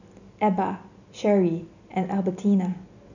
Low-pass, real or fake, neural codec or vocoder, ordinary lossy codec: 7.2 kHz; real; none; none